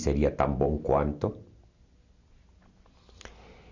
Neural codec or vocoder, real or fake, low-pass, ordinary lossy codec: none; real; 7.2 kHz; none